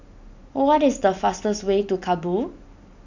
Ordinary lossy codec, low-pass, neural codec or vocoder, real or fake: none; 7.2 kHz; none; real